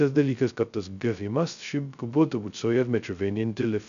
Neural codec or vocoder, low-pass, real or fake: codec, 16 kHz, 0.2 kbps, FocalCodec; 7.2 kHz; fake